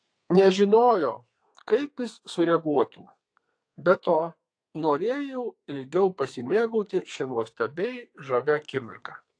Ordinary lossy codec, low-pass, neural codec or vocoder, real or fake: AAC, 48 kbps; 9.9 kHz; codec, 32 kHz, 1.9 kbps, SNAC; fake